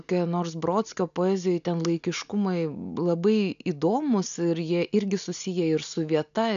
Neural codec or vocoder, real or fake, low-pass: none; real; 7.2 kHz